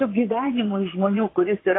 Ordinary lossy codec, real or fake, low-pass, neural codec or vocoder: AAC, 16 kbps; fake; 7.2 kHz; vocoder, 22.05 kHz, 80 mel bands, Vocos